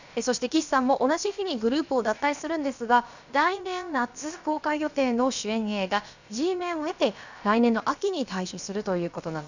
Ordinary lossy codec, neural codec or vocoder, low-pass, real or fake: none; codec, 16 kHz, about 1 kbps, DyCAST, with the encoder's durations; 7.2 kHz; fake